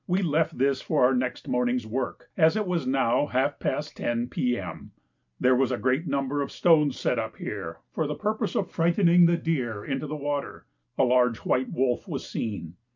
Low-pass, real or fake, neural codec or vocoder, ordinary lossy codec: 7.2 kHz; real; none; MP3, 64 kbps